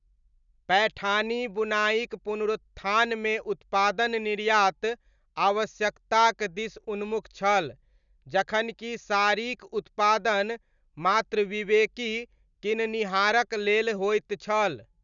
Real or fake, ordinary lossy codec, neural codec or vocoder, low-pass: real; none; none; 7.2 kHz